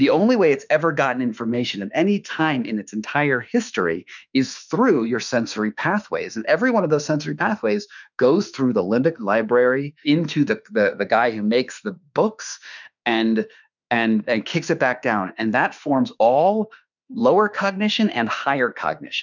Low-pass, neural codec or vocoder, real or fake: 7.2 kHz; autoencoder, 48 kHz, 32 numbers a frame, DAC-VAE, trained on Japanese speech; fake